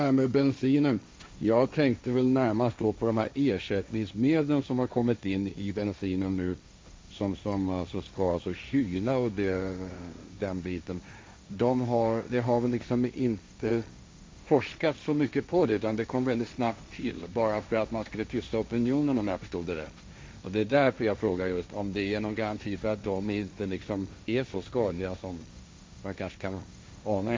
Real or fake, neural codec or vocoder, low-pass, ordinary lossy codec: fake; codec, 16 kHz, 1.1 kbps, Voila-Tokenizer; none; none